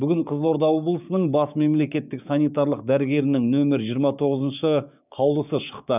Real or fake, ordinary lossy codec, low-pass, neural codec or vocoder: fake; none; 3.6 kHz; codec, 16 kHz, 6 kbps, DAC